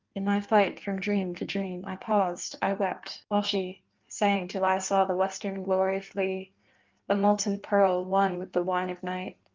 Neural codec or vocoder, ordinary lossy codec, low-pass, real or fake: codec, 16 kHz in and 24 kHz out, 1.1 kbps, FireRedTTS-2 codec; Opus, 32 kbps; 7.2 kHz; fake